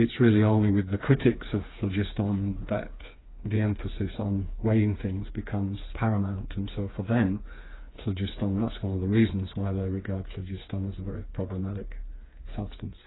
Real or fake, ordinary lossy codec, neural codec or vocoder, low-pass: fake; AAC, 16 kbps; codec, 16 kHz, 4 kbps, FreqCodec, smaller model; 7.2 kHz